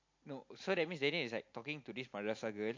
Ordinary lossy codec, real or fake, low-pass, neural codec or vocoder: MP3, 48 kbps; real; 7.2 kHz; none